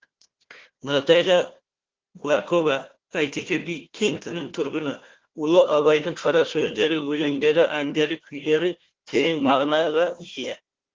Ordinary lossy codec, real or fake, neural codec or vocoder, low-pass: Opus, 16 kbps; fake; codec, 16 kHz, 1 kbps, FunCodec, trained on Chinese and English, 50 frames a second; 7.2 kHz